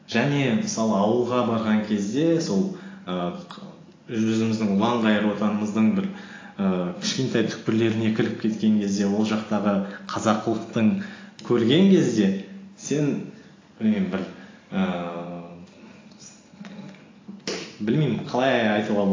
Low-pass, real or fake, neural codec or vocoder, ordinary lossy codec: 7.2 kHz; real; none; AAC, 32 kbps